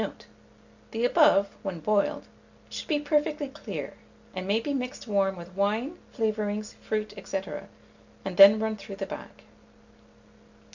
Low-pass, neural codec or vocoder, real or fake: 7.2 kHz; none; real